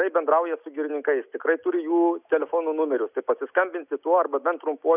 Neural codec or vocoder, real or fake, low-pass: none; real; 3.6 kHz